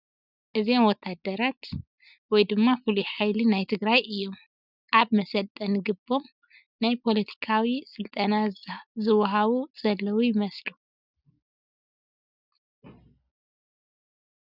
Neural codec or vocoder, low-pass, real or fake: none; 5.4 kHz; real